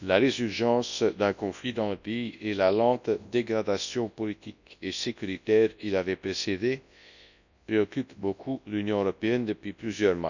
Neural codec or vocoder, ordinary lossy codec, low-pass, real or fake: codec, 24 kHz, 0.9 kbps, WavTokenizer, large speech release; none; 7.2 kHz; fake